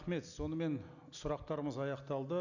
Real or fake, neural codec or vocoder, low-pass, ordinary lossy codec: real; none; 7.2 kHz; none